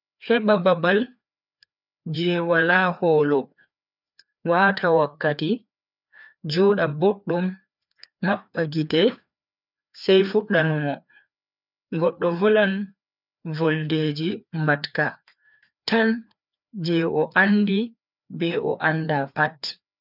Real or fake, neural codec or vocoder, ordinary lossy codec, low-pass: fake; codec, 16 kHz, 2 kbps, FreqCodec, larger model; none; 5.4 kHz